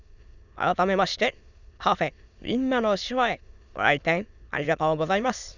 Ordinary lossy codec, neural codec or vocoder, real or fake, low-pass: none; autoencoder, 22.05 kHz, a latent of 192 numbers a frame, VITS, trained on many speakers; fake; 7.2 kHz